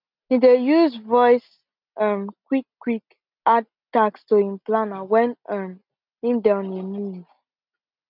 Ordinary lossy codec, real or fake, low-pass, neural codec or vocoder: none; real; 5.4 kHz; none